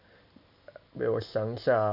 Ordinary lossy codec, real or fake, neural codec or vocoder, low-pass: none; real; none; 5.4 kHz